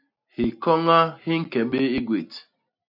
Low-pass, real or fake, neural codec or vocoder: 5.4 kHz; real; none